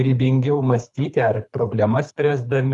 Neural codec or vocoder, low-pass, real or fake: codec, 24 kHz, 3 kbps, HILCodec; 10.8 kHz; fake